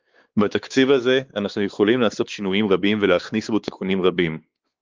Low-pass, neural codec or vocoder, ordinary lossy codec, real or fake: 7.2 kHz; codec, 16 kHz, 4 kbps, X-Codec, WavLM features, trained on Multilingual LibriSpeech; Opus, 32 kbps; fake